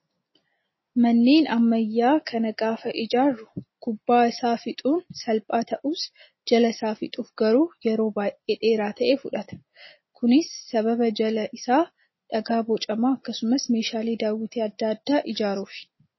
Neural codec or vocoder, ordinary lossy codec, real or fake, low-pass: none; MP3, 24 kbps; real; 7.2 kHz